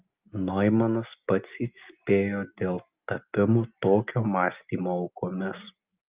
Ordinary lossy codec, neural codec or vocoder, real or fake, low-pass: Opus, 32 kbps; none; real; 3.6 kHz